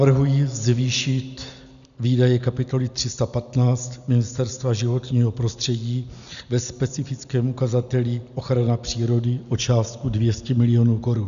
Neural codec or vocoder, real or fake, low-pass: none; real; 7.2 kHz